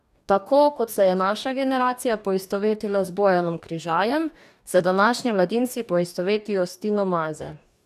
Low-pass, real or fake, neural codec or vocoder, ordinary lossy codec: 14.4 kHz; fake; codec, 44.1 kHz, 2.6 kbps, DAC; none